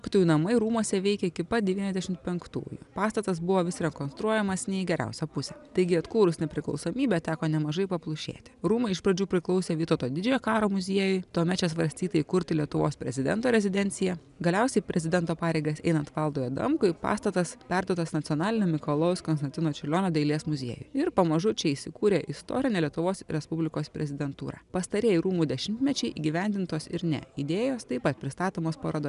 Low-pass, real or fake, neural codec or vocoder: 10.8 kHz; real; none